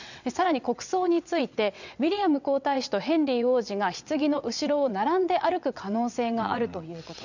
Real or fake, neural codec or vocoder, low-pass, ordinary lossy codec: fake; vocoder, 22.05 kHz, 80 mel bands, WaveNeXt; 7.2 kHz; none